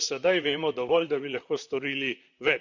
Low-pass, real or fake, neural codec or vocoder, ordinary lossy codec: 7.2 kHz; fake; vocoder, 44.1 kHz, 128 mel bands, Pupu-Vocoder; none